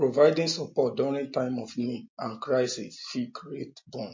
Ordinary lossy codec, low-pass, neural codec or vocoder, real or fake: MP3, 32 kbps; 7.2 kHz; none; real